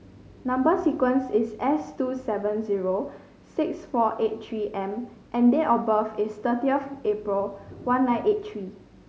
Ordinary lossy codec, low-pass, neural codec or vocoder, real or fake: none; none; none; real